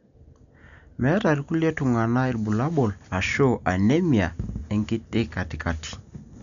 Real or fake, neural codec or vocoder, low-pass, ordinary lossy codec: real; none; 7.2 kHz; none